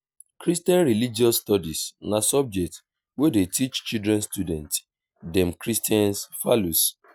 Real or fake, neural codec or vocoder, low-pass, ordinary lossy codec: real; none; none; none